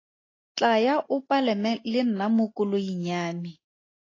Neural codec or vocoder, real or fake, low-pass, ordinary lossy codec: none; real; 7.2 kHz; AAC, 32 kbps